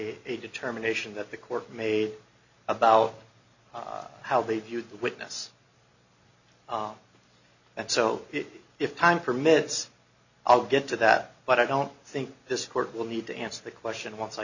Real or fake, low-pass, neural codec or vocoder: real; 7.2 kHz; none